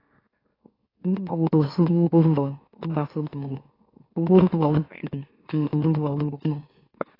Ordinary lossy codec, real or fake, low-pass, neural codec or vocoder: AAC, 24 kbps; fake; 5.4 kHz; autoencoder, 44.1 kHz, a latent of 192 numbers a frame, MeloTTS